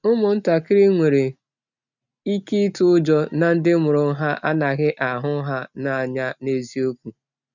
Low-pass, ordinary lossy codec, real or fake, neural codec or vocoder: 7.2 kHz; none; real; none